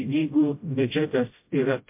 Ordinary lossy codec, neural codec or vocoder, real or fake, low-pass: MP3, 32 kbps; codec, 16 kHz, 0.5 kbps, FreqCodec, smaller model; fake; 3.6 kHz